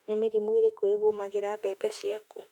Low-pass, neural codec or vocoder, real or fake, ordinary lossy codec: 19.8 kHz; autoencoder, 48 kHz, 32 numbers a frame, DAC-VAE, trained on Japanese speech; fake; none